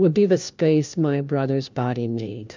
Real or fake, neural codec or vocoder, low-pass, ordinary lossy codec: fake; codec, 16 kHz, 1 kbps, FunCodec, trained on LibriTTS, 50 frames a second; 7.2 kHz; MP3, 64 kbps